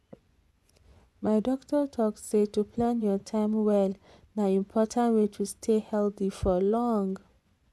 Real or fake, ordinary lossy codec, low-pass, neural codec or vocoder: fake; none; none; vocoder, 24 kHz, 100 mel bands, Vocos